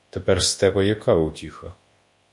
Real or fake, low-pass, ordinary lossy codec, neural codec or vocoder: fake; 10.8 kHz; MP3, 48 kbps; codec, 24 kHz, 0.9 kbps, WavTokenizer, large speech release